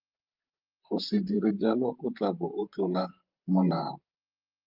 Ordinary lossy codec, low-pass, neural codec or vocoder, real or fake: Opus, 24 kbps; 5.4 kHz; vocoder, 44.1 kHz, 128 mel bands, Pupu-Vocoder; fake